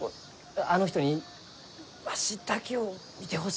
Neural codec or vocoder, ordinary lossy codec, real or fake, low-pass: none; none; real; none